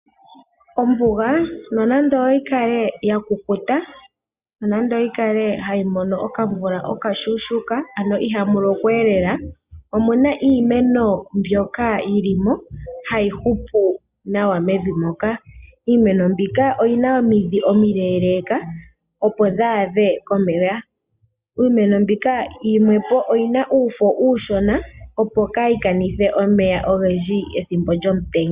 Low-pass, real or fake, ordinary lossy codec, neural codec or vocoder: 3.6 kHz; real; Opus, 64 kbps; none